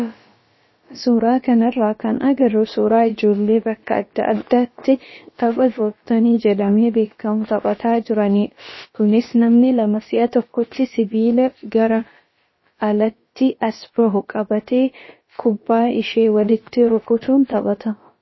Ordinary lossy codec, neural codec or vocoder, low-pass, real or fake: MP3, 24 kbps; codec, 16 kHz, about 1 kbps, DyCAST, with the encoder's durations; 7.2 kHz; fake